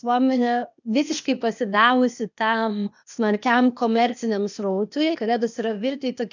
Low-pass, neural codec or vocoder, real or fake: 7.2 kHz; codec, 16 kHz, 0.8 kbps, ZipCodec; fake